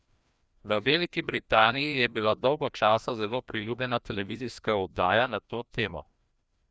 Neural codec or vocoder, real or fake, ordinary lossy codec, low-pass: codec, 16 kHz, 1 kbps, FreqCodec, larger model; fake; none; none